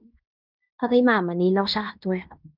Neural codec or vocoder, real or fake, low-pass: codec, 16 kHz in and 24 kHz out, 0.9 kbps, LongCat-Audio-Codec, fine tuned four codebook decoder; fake; 5.4 kHz